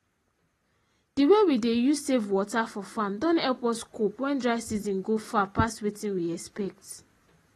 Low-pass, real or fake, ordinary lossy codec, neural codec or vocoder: 19.8 kHz; real; AAC, 32 kbps; none